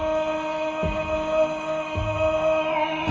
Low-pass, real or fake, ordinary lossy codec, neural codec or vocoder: 7.2 kHz; real; Opus, 24 kbps; none